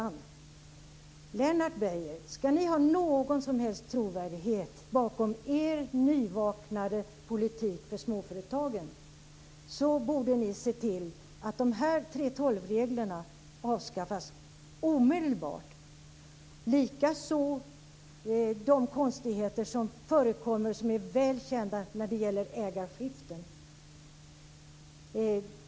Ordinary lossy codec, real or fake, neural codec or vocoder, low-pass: none; real; none; none